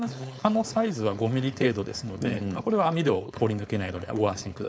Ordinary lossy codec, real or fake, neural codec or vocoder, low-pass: none; fake; codec, 16 kHz, 4.8 kbps, FACodec; none